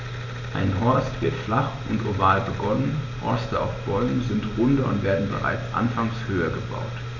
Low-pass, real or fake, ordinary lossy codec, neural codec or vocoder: 7.2 kHz; real; none; none